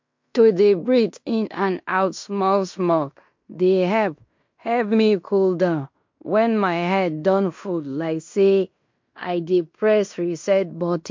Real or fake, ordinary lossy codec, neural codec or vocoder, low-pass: fake; MP3, 48 kbps; codec, 16 kHz in and 24 kHz out, 0.9 kbps, LongCat-Audio-Codec, fine tuned four codebook decoder; 7.2 kHz